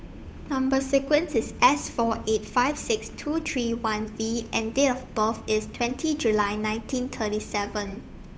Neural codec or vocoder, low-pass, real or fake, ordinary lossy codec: codec, 16 kHz, 8 kbps, FunCodec, trained on Chinese and English, 25 frames a second; none; fake; none